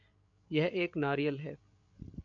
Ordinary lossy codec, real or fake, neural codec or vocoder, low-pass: MP3, 64 kbps; fake; codec, 16 kHz, 8 kbps, FreqCodec, larger model; 7.2 kHz